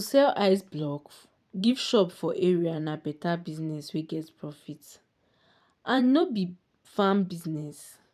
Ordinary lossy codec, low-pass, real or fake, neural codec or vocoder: none; 14.4 kHz; fake; vocoder, 44.1 kHz, 128 mel bands every 512 samples, BigVGAN v2